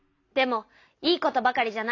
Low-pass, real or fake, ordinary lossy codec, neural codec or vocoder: 7.2 kHz; real; AAC, 48 kbps; none